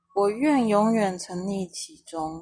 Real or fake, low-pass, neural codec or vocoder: real; 9.9 kHz; none